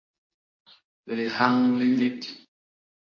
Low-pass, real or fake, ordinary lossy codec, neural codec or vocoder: 7.2 kHz; fake; MP3, 32 kbps; codec, 24 kHz, 0.9 kbps, WavTokenizer, medium speech release version 2